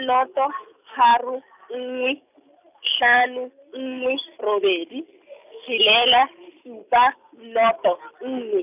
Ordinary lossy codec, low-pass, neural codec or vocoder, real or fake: none; 3.6 kHz; none; real